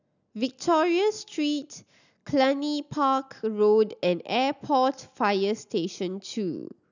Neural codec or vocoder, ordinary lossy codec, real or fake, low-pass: none; none; real; 7.2 kHz